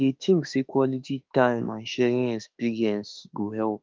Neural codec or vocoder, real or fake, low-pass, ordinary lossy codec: autoencoder, 48 kHz, 32 numbers a frame, DAC-VAE, trained on Japanese speech; fake; 7.2 kHz; Opus, 32 kbps